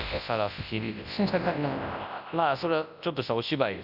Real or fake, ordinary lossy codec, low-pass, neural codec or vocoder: fake; none; 5.4 kHz; codec, 24 kHz, 0.9 kbps, WavTokenizer, large speech release